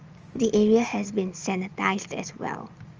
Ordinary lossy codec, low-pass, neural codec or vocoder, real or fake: Opus, 24 kbps; 7.2 kHz; none; real